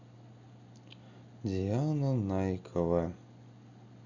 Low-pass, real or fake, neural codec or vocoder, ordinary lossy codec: 7.2 kHz; real; none; none